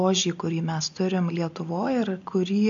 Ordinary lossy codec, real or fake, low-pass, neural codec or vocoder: MP3, 64 kbps; real; 7.2 kHz; none